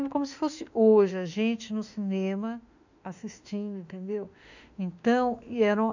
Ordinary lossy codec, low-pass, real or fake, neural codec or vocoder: none; 7.2 kHz; fake; autoencoder, 48 kHz, 32 numbers a frame, DAC-VAE, trained on Japanese speech